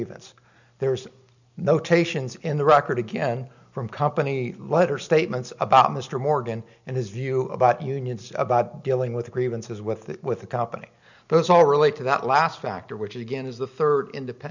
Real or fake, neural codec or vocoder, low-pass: real; none; 7.2 kHz